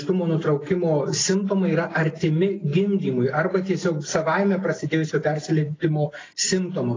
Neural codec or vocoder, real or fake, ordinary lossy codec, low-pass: none; real; AAC, 32 kbps; 7.2 kHz